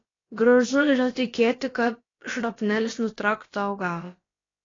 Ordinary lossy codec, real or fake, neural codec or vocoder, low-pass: AAC, 32 kbps; fake; codec, 16 kHz, about 1 kbps, DyCAST, with the encoder's durations; 7.2 kHz